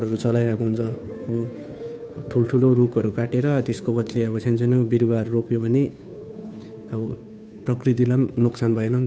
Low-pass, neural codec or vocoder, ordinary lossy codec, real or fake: none; codec, 16 kHz, 2 kbps, FunCodec, trained on Chinese and English, 25 frames a second; none; fake